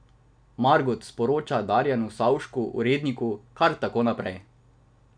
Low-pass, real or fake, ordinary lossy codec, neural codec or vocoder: 9.9 kHz; real; none; none